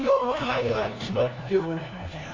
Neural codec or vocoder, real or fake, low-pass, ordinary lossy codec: codec, 16 kHz, 1 kbps, FunCodec, trained on LibriTTS, 50 frames a second; fake; 7.2 kHz; AAC, 32 kbps